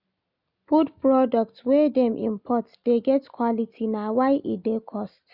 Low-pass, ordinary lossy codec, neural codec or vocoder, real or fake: 5.4 kHz; none; none; real